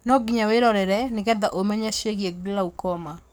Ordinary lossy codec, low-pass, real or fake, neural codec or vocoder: none; none; fake; codec, 44.1 kHz, 7.8 kbps, DAC